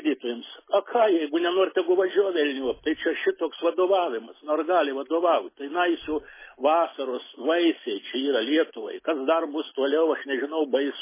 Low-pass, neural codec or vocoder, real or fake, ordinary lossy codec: 3.6 kHz; none; real; MP3, 16 kbps